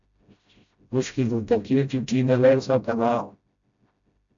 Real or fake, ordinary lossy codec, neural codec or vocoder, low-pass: fake; AAC, 64 kbps; codec, 16 kHz, 0.5 kbps, FreqCodec, smaller model; 7.2 kHz